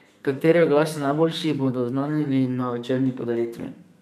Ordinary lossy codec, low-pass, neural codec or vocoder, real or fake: none; 14.4 kHz; codec, 32 kHz, 1.9 kbps, SNAC; fake